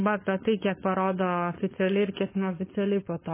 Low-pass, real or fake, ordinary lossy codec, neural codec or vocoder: 3.6 kHz; fake; MP3, 16 kbps; codec, 16 kHz, 4.8 kbps, FACodec